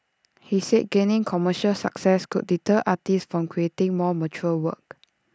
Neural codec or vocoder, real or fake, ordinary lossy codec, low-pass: none; real; none; none